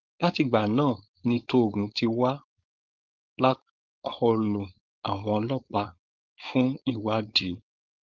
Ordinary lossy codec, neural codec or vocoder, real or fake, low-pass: Opus, 32 kbps; codec, 16 kHz, 4.8 kbps, FACodec; fake; 7.2 kHz